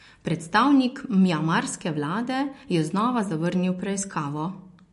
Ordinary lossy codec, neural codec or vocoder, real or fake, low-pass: MP3, 48 kbps; none; real; 14.4 kHz